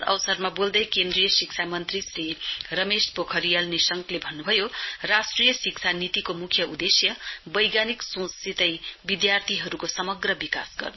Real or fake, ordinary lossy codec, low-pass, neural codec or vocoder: real; MP3, 24 kbps; 7.2 kHz; none